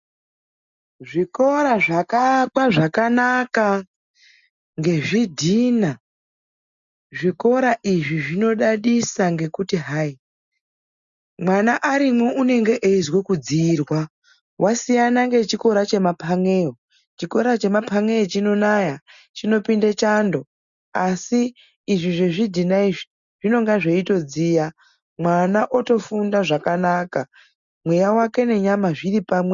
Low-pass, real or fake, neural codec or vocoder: 7.2 kHz; real; none